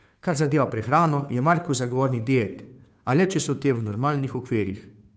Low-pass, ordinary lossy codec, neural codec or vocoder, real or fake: none; none; codec, 16 kHz, 2 kbps, FunCodec, trained on Chinese and English, 25 frames a second; fake